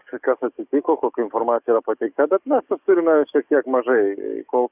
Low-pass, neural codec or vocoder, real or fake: 3.6 kHz; codec, 44.1 kHz, 7.8 kbps, DAC; fake